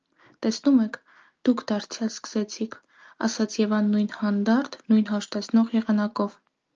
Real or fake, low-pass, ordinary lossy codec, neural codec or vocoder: real; 7.2 kHz; Opus, 24 kbps; none